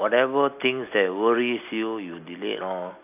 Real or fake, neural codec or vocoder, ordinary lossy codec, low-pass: real; none; none; 3.6 kHz